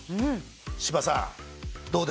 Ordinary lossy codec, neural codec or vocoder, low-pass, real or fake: none; none; none; real